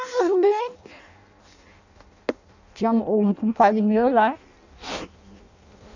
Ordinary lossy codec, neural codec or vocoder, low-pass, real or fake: none; codec, 16 kHz in and 24 kHz out, 0.6 kbps, FireRedTTS-2 codec; 7.2 kHz; fake